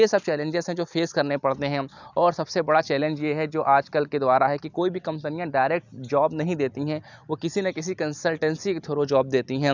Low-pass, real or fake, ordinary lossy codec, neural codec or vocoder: 7.2 kHz; real; none; none